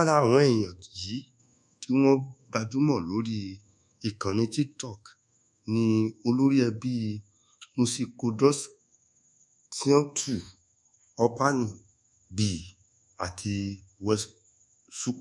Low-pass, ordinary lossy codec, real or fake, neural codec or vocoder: none; none; fake; codec, 24 kHz, 1.2 kbps, DualCodec